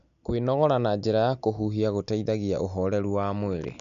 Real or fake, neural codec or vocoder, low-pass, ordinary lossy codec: real; none; 7.2 kHz; none